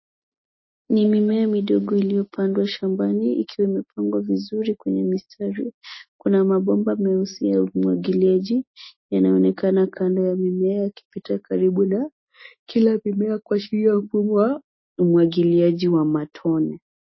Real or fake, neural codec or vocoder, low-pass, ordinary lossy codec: real; none; 7.2 kHz; MP3, 24 kbps